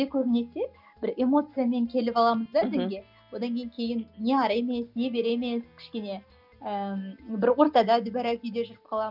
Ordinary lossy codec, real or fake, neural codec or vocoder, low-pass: none; real; none; 5.4 kHz